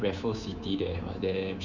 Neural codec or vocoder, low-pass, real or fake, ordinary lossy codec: codec, 24 kHz, 3.1 kbps, DualCodec; 7.2 kHz; fake; none